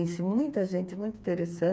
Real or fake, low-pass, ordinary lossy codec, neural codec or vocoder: fake; none; none; codec, 16 kHz, 4 kbps, FreqCodec, smaller model